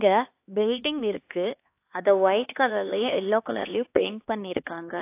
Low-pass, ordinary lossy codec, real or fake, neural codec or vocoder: 3.6 kHz; AAC, 24 kbps; fake; codec, 16 kHz, 2 kbps, X-Codec, HuBERT features, trained on LibriSpeech